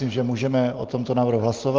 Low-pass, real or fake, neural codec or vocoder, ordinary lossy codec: 7.2 kHz; real; none; Opus, 24 kbps